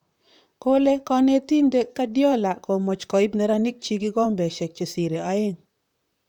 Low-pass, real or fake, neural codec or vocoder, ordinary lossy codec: 19.8 kHz; fake; vocoder, 44.1 kHz, 128 mel bands, Pupu-Vocoder; none